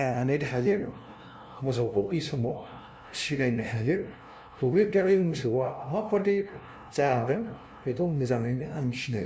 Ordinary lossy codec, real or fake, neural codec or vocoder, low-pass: none; fake; codec, 16 kHz, 0.5 kbps, FunCodec, trained on LibriTTS, 25 frames a second; none